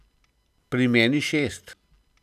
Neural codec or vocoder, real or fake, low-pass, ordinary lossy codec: none; real; 14.4 kHz; none